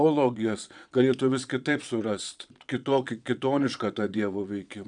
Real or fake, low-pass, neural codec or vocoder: fake; 9.9 kHz; vocoder, 22.05 kHz, 80 mel bands, Vocos